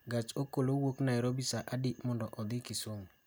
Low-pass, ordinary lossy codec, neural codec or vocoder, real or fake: none; none; none; real